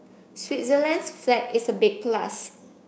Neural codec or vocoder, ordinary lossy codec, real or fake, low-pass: codec, 16 kHz, 6 kbps, DAC; none; fake; none